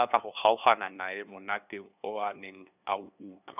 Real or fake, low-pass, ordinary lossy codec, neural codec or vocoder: fake; 3.6 kHz; none; codec, 16 kHz, 2 kbps, FunCodec, trained on LibriTTS, 25 frames a second